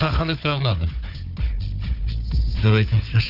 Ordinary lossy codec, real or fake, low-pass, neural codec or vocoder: none; fake; 5.4 kHz; codec, 16 kHz, 4 kbps, FunCodec, trained on Chinese and English, 50 frames a second